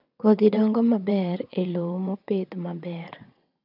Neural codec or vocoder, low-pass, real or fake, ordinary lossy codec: vocoder, 22.05 kHz, 80 mel bands, WaveNeXt; 5.4 kHz; fake; none